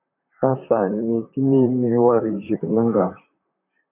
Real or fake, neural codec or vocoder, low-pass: fake; vocoder, 44.1 kHz, 128 mel bands, Pupu-Vocoder; 3.6 kHz